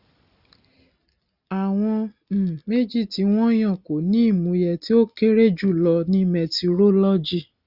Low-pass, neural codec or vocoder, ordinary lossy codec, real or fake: 5.4 kHz; none; Opus, 64 kbps; real